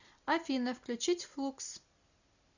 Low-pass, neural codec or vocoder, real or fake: 7.2 kHz; none; real